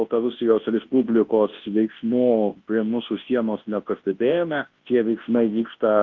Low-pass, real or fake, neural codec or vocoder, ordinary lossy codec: 7.2 kHz; fake; codec, 24 kHz, 0.9 kbps, WavTokenizer, large speech release; Opus, 16 kbps